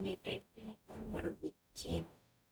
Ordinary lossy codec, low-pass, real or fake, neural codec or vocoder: none; none; fake; codec, 44.1 kHz, 0.9 kbps, DAC